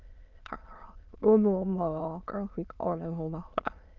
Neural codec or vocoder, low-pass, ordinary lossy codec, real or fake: autoencoder, 22.05 kHz, a latent of 192 numbers a frame, VITS, trained on many speakers; 7.2 kHz; Opus, 24 kbps; fake